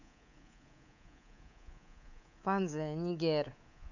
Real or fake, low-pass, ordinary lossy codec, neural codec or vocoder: fake; 7.2 kHz; none; codec, 24 kHz, 3.1 kbps, DualCodec